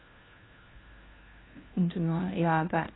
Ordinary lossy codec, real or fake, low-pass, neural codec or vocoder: AAC, 16 kbps; fake; 7.2 kHz; codec, 16 kHz, 1 kbps, FunCodec, trained on LibriTTS, 50 frames a second